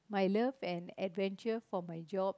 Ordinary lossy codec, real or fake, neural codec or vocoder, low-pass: none; real; none; none